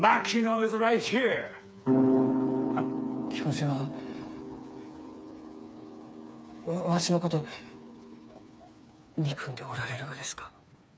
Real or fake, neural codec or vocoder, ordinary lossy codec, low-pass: fake; codec, 16 kHz, 4 kbps, FreqCodec, smaller model; none; none